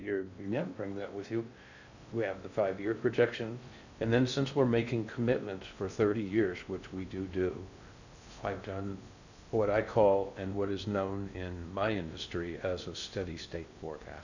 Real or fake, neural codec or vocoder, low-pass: fake; codec, 16 kHz in and 24 kHz out, 0.6 kbps, FocalCodec, streaming, 2048 codes; 7.2 kHz